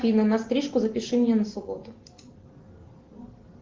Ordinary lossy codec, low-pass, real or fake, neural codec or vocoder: Opus, 16 kbps; 7.2 kHz; real; none